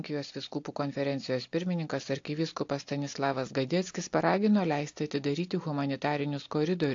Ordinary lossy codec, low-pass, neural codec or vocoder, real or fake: AAC, 48 kbps; 7.2 kHz; none; real